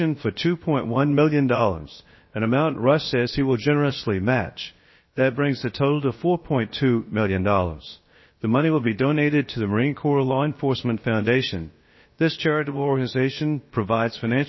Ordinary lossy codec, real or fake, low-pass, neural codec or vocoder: MP3, 24 kbps; fake; 7.2 kHz; codec, 16 kHz, about 1 kbps, DyCAST, with the encoder's durations